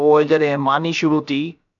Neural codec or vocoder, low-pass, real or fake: codec, 16 kHz, about 1 kbps, DyCAST, with the encoder's durations; 7.2 kHz; fake